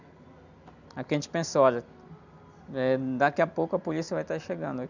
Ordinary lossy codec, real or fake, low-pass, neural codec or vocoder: none; real; 7.2 kHz; none